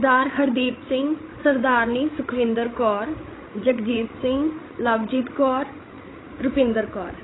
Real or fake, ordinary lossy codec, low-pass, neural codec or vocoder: fake; AAC, 16 kbps; 7.2 kHz; codec, 16 kHz, 16 kbps, FreqCodec, larger model